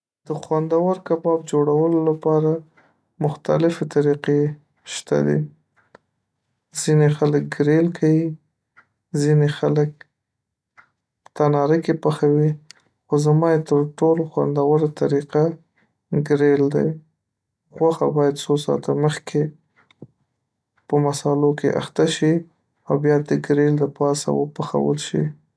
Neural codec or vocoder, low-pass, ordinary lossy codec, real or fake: none; none; none; real